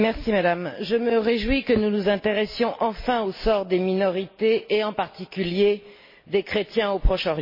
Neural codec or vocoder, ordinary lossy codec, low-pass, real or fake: none; MP3, 24 kbps; 5.4 kHz; real